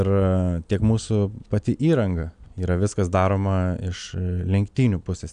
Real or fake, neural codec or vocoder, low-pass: real; none; 9.9 kHz